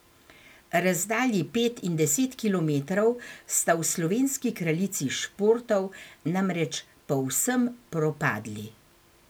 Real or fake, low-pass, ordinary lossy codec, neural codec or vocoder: real; none; none; none